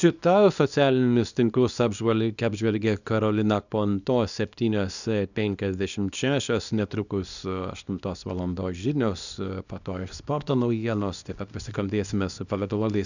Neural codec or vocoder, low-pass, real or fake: codec, 24 kHz, 0.9 kbps, WavTokenizer, small release; 7.2 kHz; fake